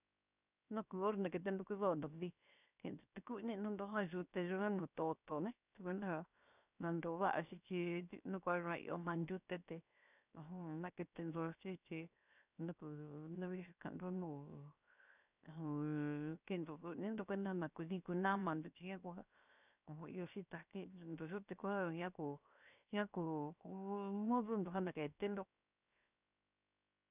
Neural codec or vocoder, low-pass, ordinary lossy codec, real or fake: codec, 16 kHz, 0.7 kbps, FocalCodec; 3.6 kHz; none; fake